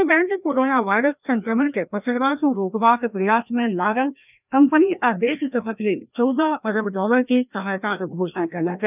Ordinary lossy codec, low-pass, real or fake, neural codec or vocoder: none; 3.6 kHz; fake; codec, 16 kHz, 1 kbps, FreqCodec, larger model